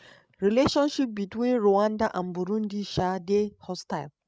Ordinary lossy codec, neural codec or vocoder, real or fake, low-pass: none; codec, 16 kHz, 16 kbps, FreqCodec, larger model; fake; none